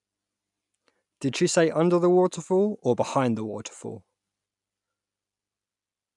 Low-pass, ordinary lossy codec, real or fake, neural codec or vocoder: 10.8 kHz; none; real; none